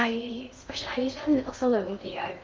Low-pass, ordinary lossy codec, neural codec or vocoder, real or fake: 7.2 kHz; Opus, 32 kbps; codec, 16 kHz in and 24 kHz out, 0.6 kbps, FocalCodec, streaming, 2048 codes; fake